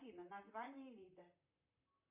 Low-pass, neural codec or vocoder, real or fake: 3.6 kHz; vocoder, 44.1 kHz, 128 mel bands, Pupu-Vocoder; fake